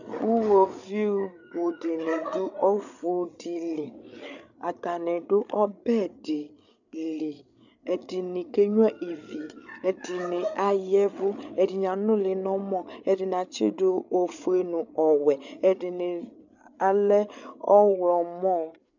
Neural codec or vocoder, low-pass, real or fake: codec, 16 kHz, 8 kbps, FreqCodec, larger model; 7.2 kHz; fake